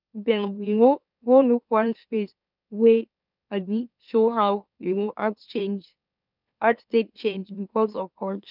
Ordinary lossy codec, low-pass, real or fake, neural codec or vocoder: none; 5.4 kHz; fake; autoencoder, 44.1 kHz, a latent of 192 numbers a frame, MeloTTS